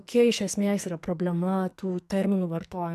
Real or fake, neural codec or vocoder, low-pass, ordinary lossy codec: fake; codec, 44.1 kHz, 2.6 kbps, SNAC; 14.4 kHz; MP3, 96 kbps